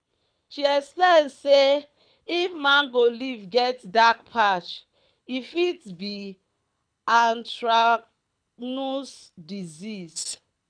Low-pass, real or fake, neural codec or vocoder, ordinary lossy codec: 9.9 kHz; fake; codec, 24 kHz, 6 kbps, HILCodec; none